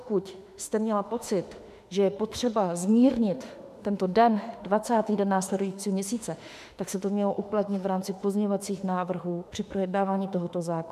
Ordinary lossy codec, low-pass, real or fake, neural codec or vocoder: MP3, 96 kbps; 14.4 kHz; fake; autoencoder, 48 kHz, 32 numbers a frame, DAC-VAE, trained on Japanese speech